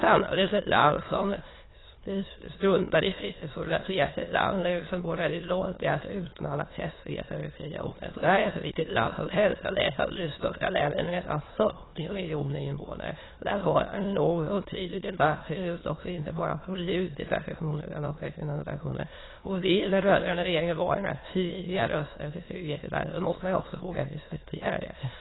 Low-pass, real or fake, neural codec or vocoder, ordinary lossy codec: 7.2 kHz; fake; autoencoder, 22.05 kHz, a latent of 192 numbers a frame, VITS, trained on many speakers; AAC, 16 kbps